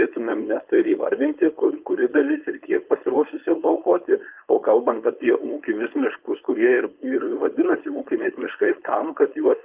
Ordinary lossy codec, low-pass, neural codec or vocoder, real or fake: Opus, 32 kbps; 3.6 kHz; codec, 16 kHz, 4.8 kbps, FACodec; fake